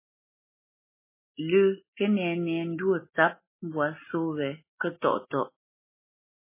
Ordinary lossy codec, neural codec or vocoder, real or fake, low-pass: MP3, 16 kbps; none; real; 3.6 kHz